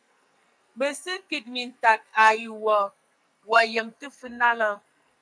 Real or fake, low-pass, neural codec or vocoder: fake; 9.9 kHz; codec, 44.1 kHz, 2.6 kbps, SNAC